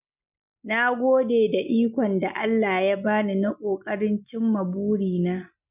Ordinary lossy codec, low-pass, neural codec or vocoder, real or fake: none; 3.6 kHz; none; real